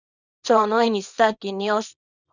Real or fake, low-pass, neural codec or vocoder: fake; 7.2 kHz; codec, 24 kHz, 0.9 kbps, WavTokenizer, small release